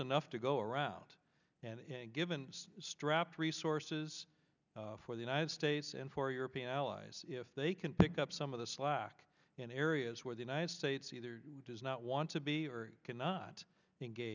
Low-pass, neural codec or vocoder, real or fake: 7.2 kHz; none; real